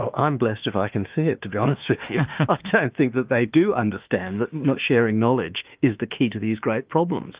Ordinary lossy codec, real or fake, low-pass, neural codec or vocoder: Opus, 32 kbps; fake; 3.6 kHz; autoencoder, 48 kHz, 32 numbers a frame, DAC-VAE, trained on Japanese speech